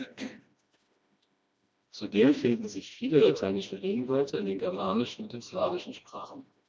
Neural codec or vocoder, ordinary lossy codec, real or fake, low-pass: codec, 16 kHz, 1 kbps, FreqCodec, smaller model; none; fake; none